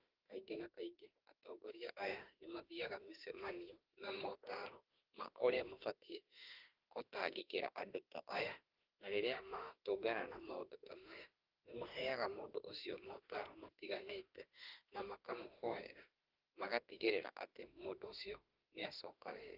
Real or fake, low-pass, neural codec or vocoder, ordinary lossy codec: fake; 5.4 kHz; autoencoder, 48 kHz, 32 numbers a frame, DAC-VAE, trained on Japanese speech; Opus, 32 kbps